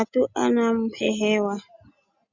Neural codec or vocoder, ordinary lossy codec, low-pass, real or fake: none; Opus, 64 kbps; 7.2 kHz; real